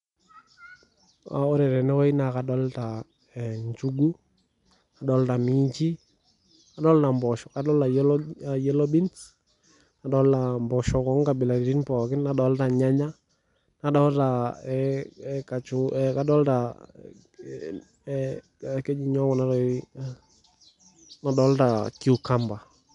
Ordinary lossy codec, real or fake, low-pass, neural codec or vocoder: none; real; 10.8 kHz; none